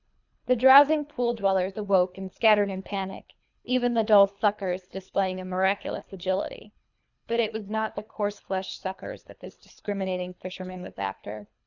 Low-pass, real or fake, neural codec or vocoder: 7.2 kHz; fake; codec, 24 kHz, 3 kbps, HILCodec